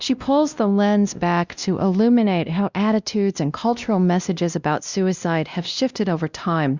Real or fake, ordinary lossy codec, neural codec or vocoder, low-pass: fake; Opus, 64 kbps; codec, 16 kHz, 1 kbps, X-Codec, WavLM features, trained on Multilingual LibriSpeech; 7.2 kHz